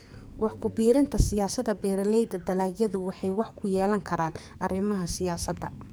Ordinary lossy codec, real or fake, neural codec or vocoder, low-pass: none; fake; codec, 44.1 kHz, 2.6 kbps, SNAC; none